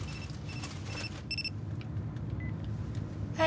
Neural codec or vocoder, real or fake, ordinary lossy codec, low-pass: none; real; none; none